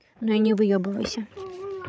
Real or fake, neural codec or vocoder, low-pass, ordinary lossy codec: fake; codec, 16 kHz, 16 kbps, FreqCodec, larger model; none; none